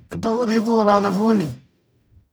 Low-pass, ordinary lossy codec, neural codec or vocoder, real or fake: none; none; codec, 44.1 kHz, 0.9 kbps, DAC; fake